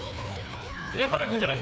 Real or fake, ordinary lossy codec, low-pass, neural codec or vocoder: fake; none; none; codec, 16 kHz, 2 kbps, FreqCodec, larger model